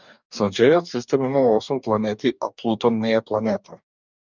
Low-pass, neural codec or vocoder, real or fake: 7.2 kHz; codec, 44.1 kHz, 2.6 kbps, DAC; fake